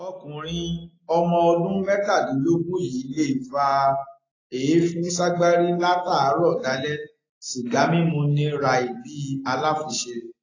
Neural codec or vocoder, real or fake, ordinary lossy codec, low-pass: none; real; AAC, 32 kbps; 7.2 kHz